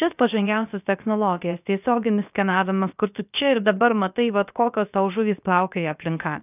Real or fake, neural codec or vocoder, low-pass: fake; codec, 16 kHz, about 1 kbps, DyCAST, with the encoder's durations; 3.6 kHz